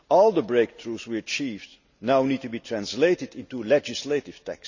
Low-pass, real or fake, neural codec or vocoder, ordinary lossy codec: 7.2 kHz; real; none; none